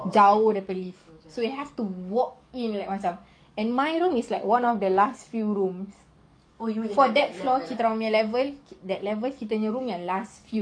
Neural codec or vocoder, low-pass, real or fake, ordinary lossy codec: codec, 44.1 kHz, 7.8 kbps, DAC; 9.9 kHz; fake; none